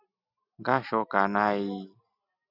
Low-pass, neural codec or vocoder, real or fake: 5.4 kHz; none; real